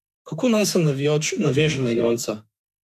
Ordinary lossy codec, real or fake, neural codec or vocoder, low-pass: none; fake; autoencoder, 48 kHz, 32 numbers a frame, DAC-VAE, trained on Japanese speech; 14.4 kHz